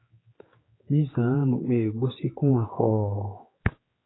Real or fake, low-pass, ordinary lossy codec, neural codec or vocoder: fake; 7.2 kHz; AAC, 16 kbps; codec, 16 kHz, 4 kbps, X-Codec, HuBERT features, trained on general audio